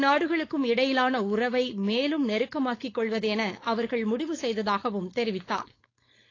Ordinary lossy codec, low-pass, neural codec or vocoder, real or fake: AAC, 32 kbps; 7.2 kHz; codec, 16 kHz, 4.8 kbps, FACodec; fake